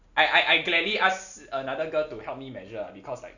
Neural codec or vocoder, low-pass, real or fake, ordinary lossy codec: none; 7.2 kHz; real; none